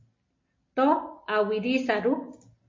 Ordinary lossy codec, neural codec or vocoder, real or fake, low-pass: MP3, 32 kbps; none; real; 7.2 kHz